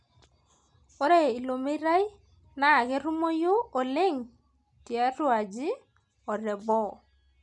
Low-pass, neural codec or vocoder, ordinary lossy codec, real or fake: 10.8 kHz; none; none; real